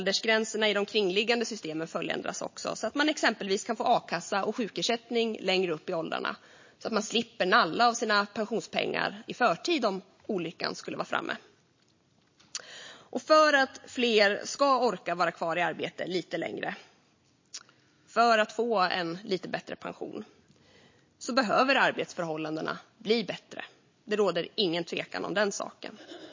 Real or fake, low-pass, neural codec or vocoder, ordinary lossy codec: real; 7.2 kHz; none; MP3, 32 kbps